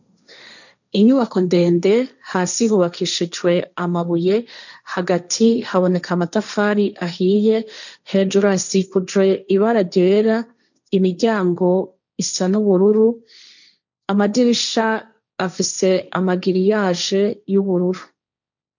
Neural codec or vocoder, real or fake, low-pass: codec, 16 kHz, 1.1 kbps, Voila-Tokenizer; fake; 7.2 kHz